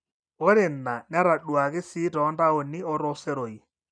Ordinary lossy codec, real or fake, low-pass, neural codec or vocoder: none; real; 9.9 kHz; none